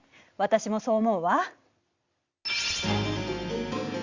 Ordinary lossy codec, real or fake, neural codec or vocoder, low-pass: Opus, 64 kbps; real; none; 7.2 kHz